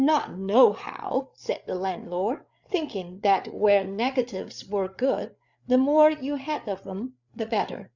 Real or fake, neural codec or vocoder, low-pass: fake; codec, 16 kHz, 8 kbps, FreqCodec, larger model; 7.2 kHz